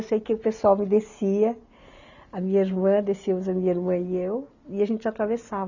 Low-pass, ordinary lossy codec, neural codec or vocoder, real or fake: 7.2 kHz; none; none; real